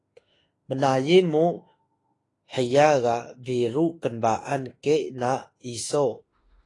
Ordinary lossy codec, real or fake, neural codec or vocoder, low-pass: AAC, 32 kbps; fake; autoencoder, 48 kHz, 32 numbers a frame, DAC-VAE, trained on Japanese speech; 10.8 kHz